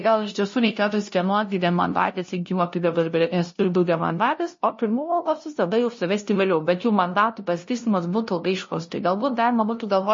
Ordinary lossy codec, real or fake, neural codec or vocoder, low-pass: MP3, 32 kbps; fake; codec, 16 kHz, 0.5 kbps, FunCodec, trained on LibriTTS, 25 frames a second; 7.2 kHz